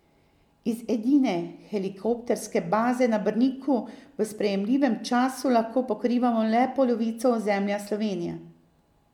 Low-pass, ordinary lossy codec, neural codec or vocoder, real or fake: 19.8 kHz; MP3, 96 kbps; none; real